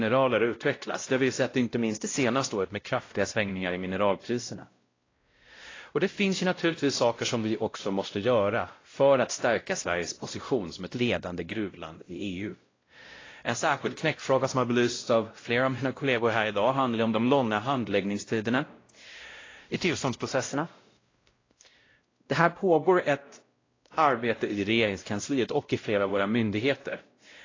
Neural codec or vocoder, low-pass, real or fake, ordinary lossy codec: codec, 16 kHz, 0.5 kbps, X-Codec, WavLM features, trained on Multilingual LibriSpeech; 7.2 kHz; fake; AAC, 32 kbps